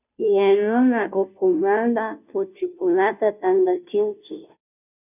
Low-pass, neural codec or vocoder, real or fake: 3.6 kHz; codec, 16 kHz, 0.5 kbps, FunCodec, trained on Chinese and English, 25 frames a second; fake